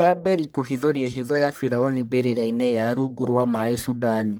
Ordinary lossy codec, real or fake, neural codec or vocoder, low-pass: none; fake; codec, 44.1 kHz, 1.7 kbps, Pupu-Codec; none